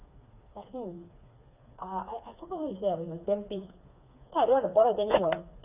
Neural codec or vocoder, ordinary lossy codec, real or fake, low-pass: codec, 16 kHz, 4 kbps, FreqCodec, smaller model; none; fake; 3.6 kHz